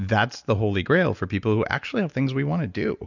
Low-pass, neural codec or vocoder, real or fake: 7.2 kHz; none; real